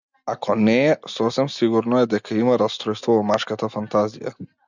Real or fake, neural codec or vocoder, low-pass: real; none; 7.2 kHz